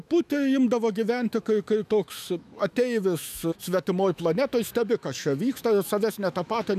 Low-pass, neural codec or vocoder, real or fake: 14.4 kHz; autoencoder, 48 kHz, 128 numbers a frame, DAC-VAE, trained on Japanese speech; fake